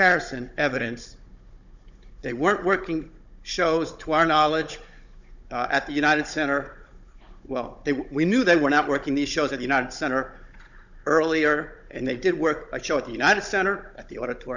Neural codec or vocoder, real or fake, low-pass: codec, 16 kHz, 16 kbps, FunCodec, trained on Chinese and English, 50 frames a second; fake; 7.2 kHz